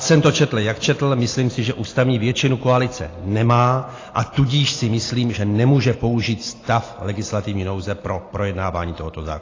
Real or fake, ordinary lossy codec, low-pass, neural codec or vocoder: real; AAC, 32 kbps; 7.2 kHz; none